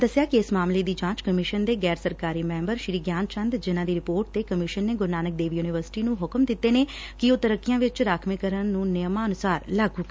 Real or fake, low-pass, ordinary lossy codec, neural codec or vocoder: real; none; none; none